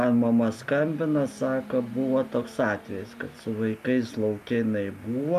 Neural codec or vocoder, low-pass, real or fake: none; 14.4 kHz; real